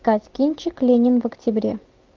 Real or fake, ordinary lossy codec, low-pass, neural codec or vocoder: real; Opus, 24 kbps; 7.2 kHz; none